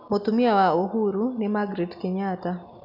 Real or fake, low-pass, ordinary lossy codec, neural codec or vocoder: real; 5.4 kHz; none; none